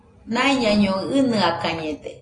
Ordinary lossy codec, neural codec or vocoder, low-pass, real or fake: AAC, 32 kbps; none; 9.9 kHz; real